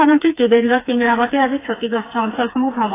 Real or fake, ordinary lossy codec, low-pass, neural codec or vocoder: fake; AAC, 16 kbps; 3.6 kHz; codec, 16 kHz, 4 kbps, FreqCodec, smaller model